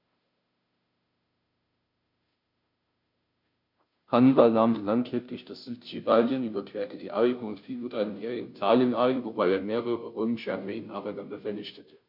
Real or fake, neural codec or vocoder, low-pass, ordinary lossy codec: fake; codec, 16 kHz, 0.5 kbps, FunCodec, trained on Chinese and English, 25 frames a second; 5.4 kHz; MP3, 48 kbps